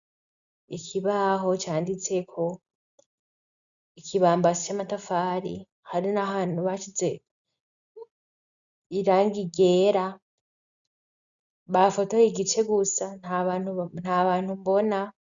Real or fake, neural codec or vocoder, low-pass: real; none; 7.2 kHz